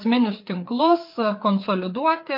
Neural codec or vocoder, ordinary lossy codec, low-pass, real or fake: codec, 16 kHz, 4 kbps, FreqCodec, larger model; MP3, 32 kbps; 5.4 kHz; fake